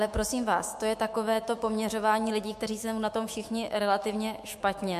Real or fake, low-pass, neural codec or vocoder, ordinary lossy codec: fake; 14.4 kHz; autoencoder, 48 kHz, 128 numbers a frame, DAC-VAE, trained on Japanese speech; MP3, 64 kbps